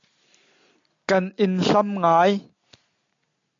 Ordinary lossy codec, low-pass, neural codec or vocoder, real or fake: MP3, 64 kbps; 7.2 kHz; none; real